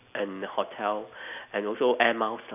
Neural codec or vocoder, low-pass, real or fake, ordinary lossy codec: none; 3.6 kHz; real; none